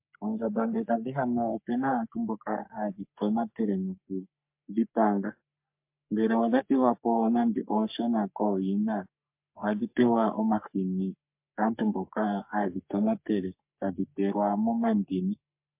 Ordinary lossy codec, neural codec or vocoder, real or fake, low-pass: MP3, 32 kbps; codec, 44.1 kHz, 3.4 kbps, Pupu-Codec; fake; 3.6 kHz